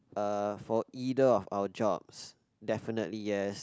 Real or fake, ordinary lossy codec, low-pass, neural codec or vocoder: real; none; none; none